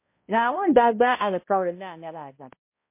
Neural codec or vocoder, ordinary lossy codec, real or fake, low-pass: codec, 16 kHz, 0.5 kbps, X-Codec, HuBERT features, trained on balanced general audio; MP3, 24 kbps; fake; 3.6 kHz